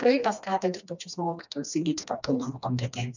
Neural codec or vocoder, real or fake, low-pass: codec, 16 kHz, 2 kbps, FreqCodec, smaller model; fake; 7.2 kHz